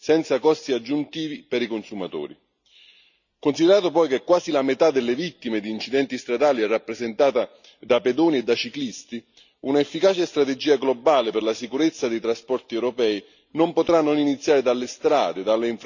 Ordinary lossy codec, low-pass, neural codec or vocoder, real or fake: none; 7.2 kHz; none; real